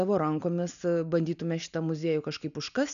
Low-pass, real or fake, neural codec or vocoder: 7.2 kHz; real; none